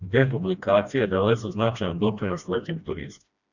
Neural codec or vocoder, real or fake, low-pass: codec, 16 kHz, 1 kbps, FreqCodec, smaller model; fake; 7.2 kHz